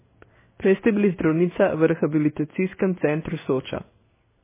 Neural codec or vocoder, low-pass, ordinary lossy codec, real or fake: codec, 16 kHz in and 24 kHz out, 1 kbps, XY-Tokenizer; 3.6 kHz; MP3, 16 kbps; fake